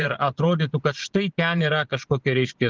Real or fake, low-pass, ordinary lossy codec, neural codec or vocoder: fake; 7.2 kHz; Opus, 16 kbps; vocoder, 22.05 kHz, 80 mel bands, Vocos